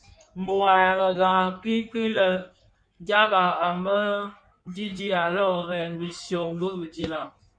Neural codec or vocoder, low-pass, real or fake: codec, 16 kHz in and 24 kHz out, 1.1 kbps, FireRedTTS-2 codec; 9.9 kHz; fake